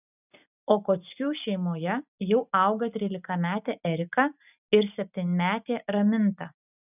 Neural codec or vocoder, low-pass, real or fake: none; 3.6 kHz; real